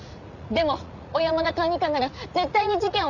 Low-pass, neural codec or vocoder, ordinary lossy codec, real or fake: 7.2 kHz; vocoder, 44.1 kHz, 80 mel bands, Vocos; none; fake